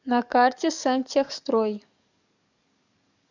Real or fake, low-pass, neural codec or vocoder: fake; 7.2 kHz; codec, 44.1 kHz, 7.8 kbps, DAC